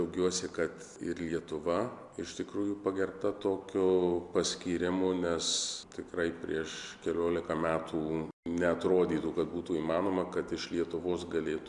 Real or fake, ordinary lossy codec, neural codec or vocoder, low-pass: real; MP3, 96 kbps; none; 10.8 kHz